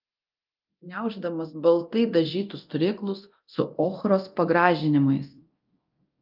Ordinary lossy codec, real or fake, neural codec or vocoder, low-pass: Opus, 24 kbps; fake; codec, 24 kHz, 0.9 kbps, DualCodec; 5.4 kHz